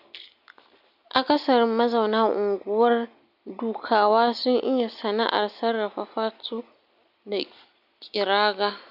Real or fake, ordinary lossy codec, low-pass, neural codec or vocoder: real; none; 5.4 kHz; none